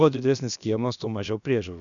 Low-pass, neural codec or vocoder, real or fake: 7.2 kHz; codec, 16 kHz, about 1 kbps, DyCAST, with the encoder's durations; fake